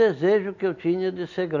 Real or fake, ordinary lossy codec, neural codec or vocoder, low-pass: real; none; none; 7.2 kHz